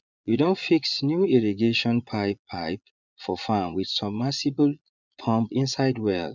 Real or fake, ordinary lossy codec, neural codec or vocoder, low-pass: fake; none; vocoder, 22.05 kHz, 80 mel bands, Vocos; 7.2 kHz